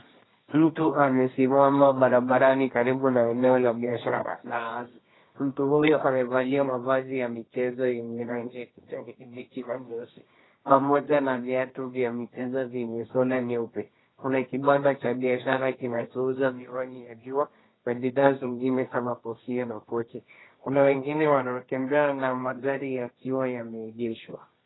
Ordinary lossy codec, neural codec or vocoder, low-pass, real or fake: AAC, 16 kbps; codec, 24 kHz, 0.9 kbps, WavTokenizer, medium music audio release; 7.2 kHz; fake